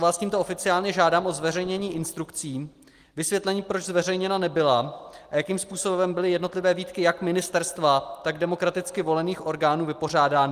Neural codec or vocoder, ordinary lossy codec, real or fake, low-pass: none; Opus, 24 kbps; real; 14.4 kHz